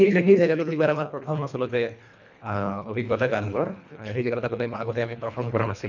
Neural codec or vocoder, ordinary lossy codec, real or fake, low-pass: codec, 24 kHz, 1.5 kbps, HILCodec; none; fake; 7.2 kHz